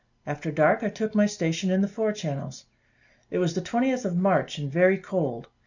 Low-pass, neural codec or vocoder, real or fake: 7.2 kHz; none; real